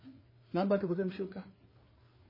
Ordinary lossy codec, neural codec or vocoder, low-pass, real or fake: MP3, 24 kbps; codec, 16 kHz, 4 kbps, FreqCodec, larger model; 5.4 kHz; fake